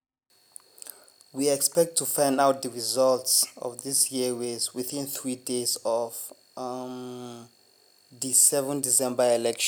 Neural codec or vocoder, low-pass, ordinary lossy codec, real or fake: none; none; none; real